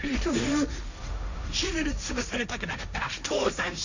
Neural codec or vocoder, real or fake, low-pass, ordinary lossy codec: codec, 16 kHz, 1.1 kbps, Voila-Tokenizer; fake; 7.2 kHz; none